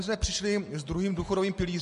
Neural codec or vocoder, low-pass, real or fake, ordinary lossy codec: none; 14.4 kHz; real; MP3, 48 kbps